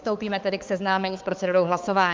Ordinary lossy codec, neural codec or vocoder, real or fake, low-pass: Opus, 24 kbps; codec, 16 kHz, 4 kbps, X-Codec, HuBERT features, trained on balanced general audio; fake; 7.2 kHz